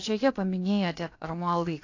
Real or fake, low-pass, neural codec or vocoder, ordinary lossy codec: fake; 7.2 kHz; codec, 16 kHz, about 1 kbps, DyCAST, with the encoder's durations; AAC, 48 kbps